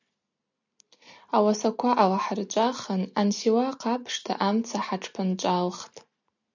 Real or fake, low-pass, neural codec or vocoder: real; 7.2 kHz; none